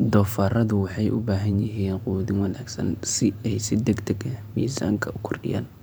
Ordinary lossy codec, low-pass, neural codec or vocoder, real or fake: none; none; vocoder, 44.1 kHz, 128 mel bands every 512 samples, BigVGAN v2; fake